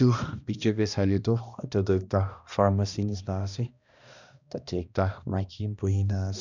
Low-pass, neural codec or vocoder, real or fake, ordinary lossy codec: 7.2 kHz; codec, 16 kHz, 1 kbps, X-Codec, HuBERT features, trained on balanced general audio; fake; none